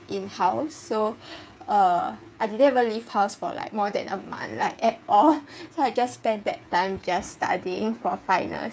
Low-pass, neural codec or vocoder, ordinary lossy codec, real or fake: none; codec, 16 kHz, 8 kbps, FreqCodec, smaller model; none; fake